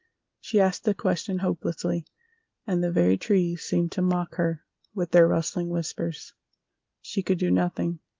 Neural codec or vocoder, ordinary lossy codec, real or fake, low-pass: none; Opus, 32 kbps; real; 7.2 kHz